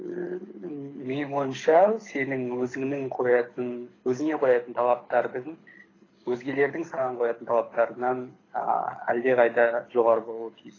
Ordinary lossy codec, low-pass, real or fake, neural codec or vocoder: AAC, 32 kbps; 7.2 kHz; fake; codec, 24 kHz, 6 kbps, HILCodec